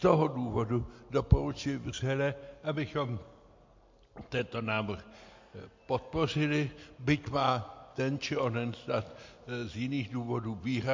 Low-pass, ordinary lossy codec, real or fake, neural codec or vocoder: 7.2 kHz; MP3, 48 kbps; real; none